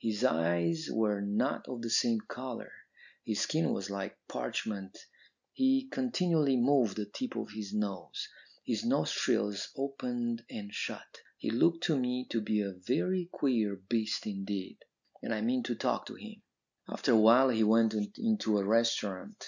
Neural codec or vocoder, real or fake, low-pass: none; real; 7.2 kHz